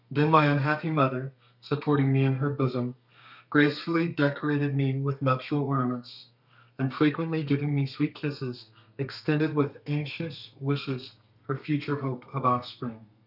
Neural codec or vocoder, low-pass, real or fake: codec, 32 kHz, 1.9 kbps, SNAC; 5.4 kHz; fake